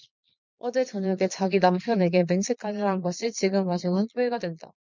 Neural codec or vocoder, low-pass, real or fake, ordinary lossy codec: vocoder, 22.05 kHz, 80 mel bands, Vocos; 7.2 kHz; fake; MP3, 64 kbps